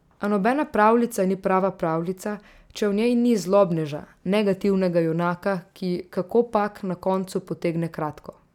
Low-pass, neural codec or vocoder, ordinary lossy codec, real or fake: 19.8 kHz; none; none; real